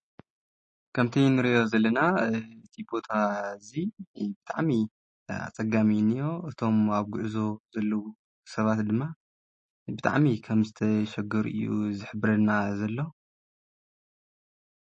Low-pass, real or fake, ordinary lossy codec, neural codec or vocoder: 10.8 kHz; real; MP3, 32 kbps; none